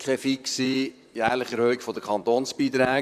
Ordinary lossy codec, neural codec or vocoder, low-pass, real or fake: none; vocoder, 44.1 kHz, 128 mel bands, Pupu-Vocoder; 14.4 kHz; fake